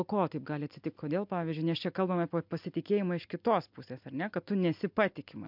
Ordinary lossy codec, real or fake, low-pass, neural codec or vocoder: MP3, 48 kbps; real; 5.4 kHz; none